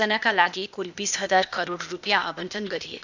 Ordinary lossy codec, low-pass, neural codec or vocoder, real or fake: none; 7.2 kHz; codec, 16 kHz, 0.8 kbps, ZipCodec; fake